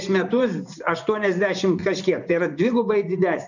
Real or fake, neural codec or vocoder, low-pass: fake; vocoder, 24 kHz, 100 mel bands, Vocos; 7.2 kHz